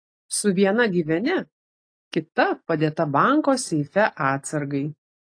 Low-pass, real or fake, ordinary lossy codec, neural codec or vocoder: 9.9 kHz; real; AAC, 48 kbps; none